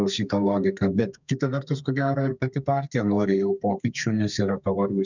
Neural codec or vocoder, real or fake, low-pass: codec, 32 kHz, 1.9 kbps, SNAC; fake; 7.2 kHz